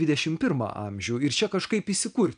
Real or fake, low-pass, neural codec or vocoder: real; 9.9 kHz; none